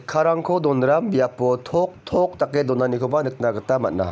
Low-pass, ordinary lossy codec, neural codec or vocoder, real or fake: none; none; none; real